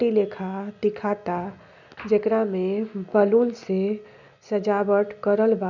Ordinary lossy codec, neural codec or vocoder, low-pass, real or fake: none; none; 7.2 kHz; real